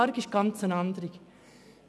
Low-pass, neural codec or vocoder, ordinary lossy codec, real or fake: none; none; none; real